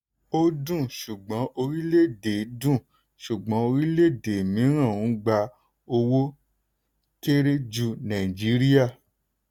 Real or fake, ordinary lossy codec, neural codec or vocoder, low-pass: real; none; none; 19.8 kHz